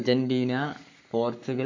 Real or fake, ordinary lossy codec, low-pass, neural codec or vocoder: fake; MP3, 48 kbps; 7.2 kHz; codec, 44.1 kHz, 7.8 kbps, Pupu-Codec